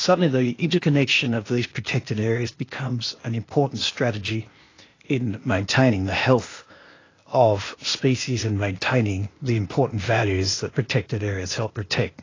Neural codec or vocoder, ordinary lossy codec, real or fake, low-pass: codec, 16 kHz, 0.8 kbps, ZipCodec; AAC, 32 kbps; fake; 7.2 kHz